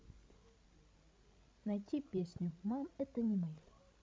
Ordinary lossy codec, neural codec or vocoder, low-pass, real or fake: none; codec, 16 kHz, 16 kbps, FreqCodec, larger model; none; fake